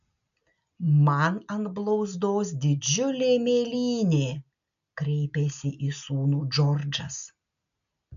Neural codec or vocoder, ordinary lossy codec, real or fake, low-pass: none; MP3, 96 kbps; real; 7.2 kHz